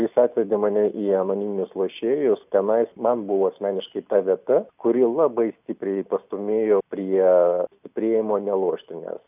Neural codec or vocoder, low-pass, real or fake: none; 3.6 kHz; real